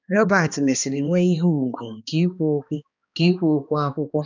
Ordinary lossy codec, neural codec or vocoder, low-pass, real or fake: none; codec, 16 kHz, 2 kbps, X-Codec, HuBERT features, trained on balanced general audio; 7.2 kHz; fake